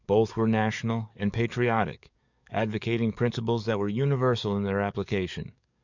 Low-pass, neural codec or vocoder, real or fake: 7.2 kHz; codec, 44.1 kHz, 7.8 kbps, DAC; fake